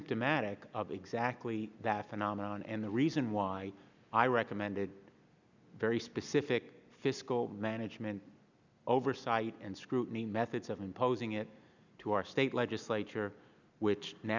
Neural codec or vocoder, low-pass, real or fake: none; 7.2 kHz; real